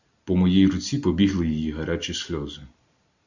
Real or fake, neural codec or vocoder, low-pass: real; none; 7.2 kHz